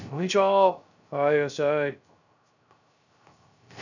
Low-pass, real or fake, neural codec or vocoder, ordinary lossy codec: 7.2 kHz; fake; codec, 16 kHz, 0.3 kbps, FocalCodec; none